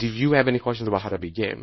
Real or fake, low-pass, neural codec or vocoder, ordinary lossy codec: fake; 7.2 kHz; codec, 24 kHz, 0.9 kbps, WavTokenizer, small release; MP3, 24 kbps